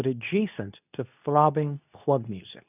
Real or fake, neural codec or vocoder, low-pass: fake; codec, 24 kHz, 0.9 kbps, WavTokenizer, medium speech release version 2; 3.6 kHz